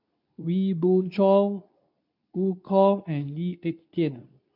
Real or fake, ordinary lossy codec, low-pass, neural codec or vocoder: fake; none; 5.4 kHz; codec, 24 kHz, 0.9 kbps, WavTokenizer, medium speech release version 2